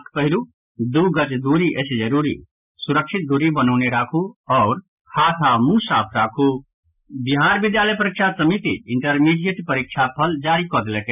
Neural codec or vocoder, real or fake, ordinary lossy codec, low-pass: none; real; none; 3.6 kHz